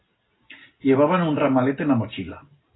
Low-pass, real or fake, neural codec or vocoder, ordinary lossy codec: 7.2 kHz; real; none; AAC, 16 kbps